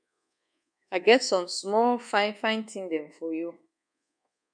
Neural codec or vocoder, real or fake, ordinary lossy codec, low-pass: codec, 24 kHz, 1.2 kbps, DualCodec; fake; MP3, 96 kbps; 9.9 kHz